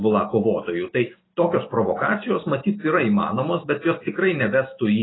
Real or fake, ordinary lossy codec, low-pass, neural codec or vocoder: real; AAC, 16 kbps; 7.2 kHz; none